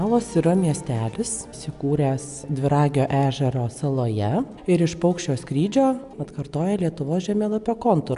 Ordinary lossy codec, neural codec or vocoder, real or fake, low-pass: AAC, 96 kbps; none; real; 10.8 kHz